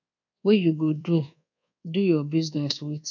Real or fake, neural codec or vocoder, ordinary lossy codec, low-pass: fake; codec, 24 kHz, 1.2 kbps, DualCodec; none; 7.2 kHz